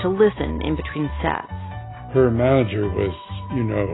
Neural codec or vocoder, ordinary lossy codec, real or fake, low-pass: none; AAC, 16 kbps; real; 7.2 kHz